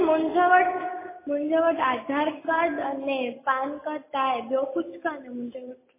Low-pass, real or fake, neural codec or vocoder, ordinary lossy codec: 3.6 kHz; real; none; MP3, 16 kbps